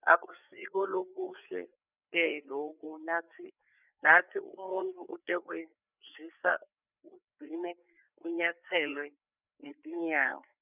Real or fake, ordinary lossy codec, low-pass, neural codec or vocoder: fake; none; 3.6 kHz; codec, 16 kHz, 4 kbps, FreqCodec, larger model